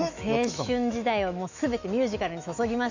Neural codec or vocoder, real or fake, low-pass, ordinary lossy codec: autoencoder, 48 kHz, 128 numbers a frame, DAC-VAE, trained on Japanese speech; fake; 7.2 kHz; AAC, 48 kbps